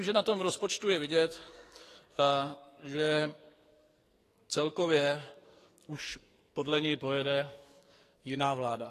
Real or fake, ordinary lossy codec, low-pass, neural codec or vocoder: fake; AAC, 48 kbps; 14.4 kHz; codec, 44.1 kHz, 2.6 kbps, SNAC